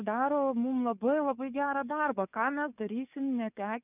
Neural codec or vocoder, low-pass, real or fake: codec, 44.1 kHz, 7.8 kbps, DAC; 3.6 kHz; fake